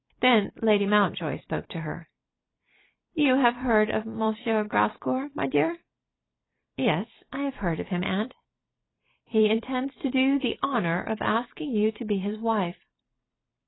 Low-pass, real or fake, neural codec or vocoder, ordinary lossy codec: 7.2 kHz; fake; vocoder, 44.1 kHz, 128 mel bands every 256 samples, BigVGAN v2; AAC, 16 kbps